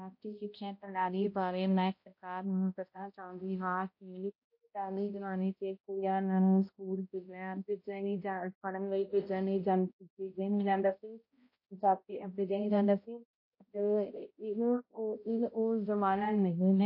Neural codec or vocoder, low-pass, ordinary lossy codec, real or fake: codec, 16 kHz, 0.5 kbps, X-Codec, HuBERT features, trained on balanced general audio; 5.4 kHz; MP3, 32 kbps; fake